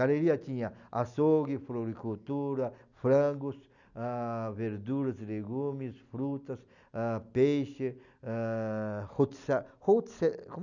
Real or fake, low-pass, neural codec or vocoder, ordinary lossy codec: real; 7.2 kHz; none; none